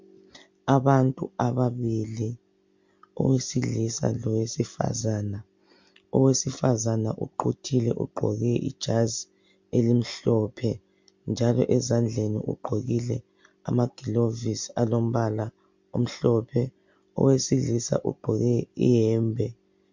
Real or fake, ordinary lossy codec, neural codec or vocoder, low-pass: real; MP3, 48 kbps; none; 7.2 kHz